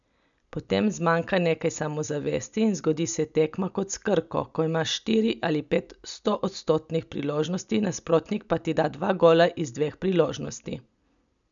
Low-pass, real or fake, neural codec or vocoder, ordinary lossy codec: 7.2 kHz; real; none; none